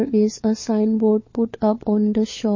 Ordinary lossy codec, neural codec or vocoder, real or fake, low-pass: MP3, 32 kbps; codec, 16 kHz, 4 kbps, FunCodec, trained on LibriTTS, 50 frames a second; fake; 7.2 kHz